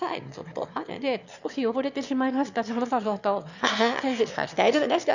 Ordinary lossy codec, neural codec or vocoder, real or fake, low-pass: none; autoencoder, 22.05 kHz, a latent of 192 numbers a frame, VITS, trained on one speaker; fake; 7.2 kHz